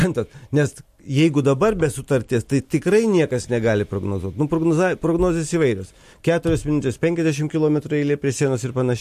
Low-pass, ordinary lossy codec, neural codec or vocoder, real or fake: 14.4 kHz; MP3, 64 kbps; none; real